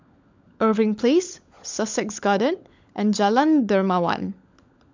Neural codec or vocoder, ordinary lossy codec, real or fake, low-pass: codec, 16 kHz, 16 kbps, FunCodec, trained on LibriTTS, 50 frames a second; MP3, 64 kbps; fake; 7.2 kHz